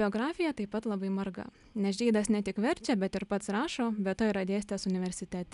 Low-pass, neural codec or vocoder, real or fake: 10.8 kHz; none; real